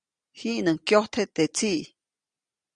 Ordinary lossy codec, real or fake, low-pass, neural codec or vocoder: Opus, 64 kbps; real; 9.9 kHz; none